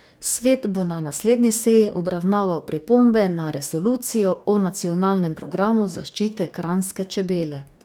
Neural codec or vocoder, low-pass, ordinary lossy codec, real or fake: codec, 44.1 kHz, 2.6 kbps, DAC; none; none; fake